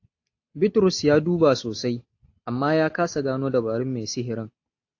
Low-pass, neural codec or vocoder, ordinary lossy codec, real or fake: 7.2 kHz; none; AAC, 48 kbps; real